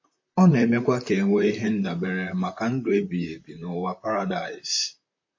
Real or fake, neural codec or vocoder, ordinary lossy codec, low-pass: fake; vocoder, 44.1 kHz, 128 mel bands, Pupu-Vocoder; MP3, 32 kbps; 7.2 kHz